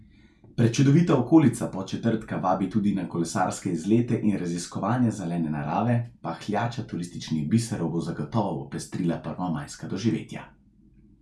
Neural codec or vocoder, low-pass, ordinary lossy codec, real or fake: none; none; none; real